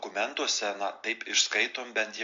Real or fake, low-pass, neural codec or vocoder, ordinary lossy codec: real; 7.2 kHz; none; AAC, 48 kbps